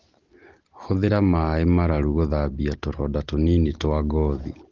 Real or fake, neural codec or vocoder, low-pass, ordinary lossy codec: real; none; 7.2 kHz; Opus, 16 kbps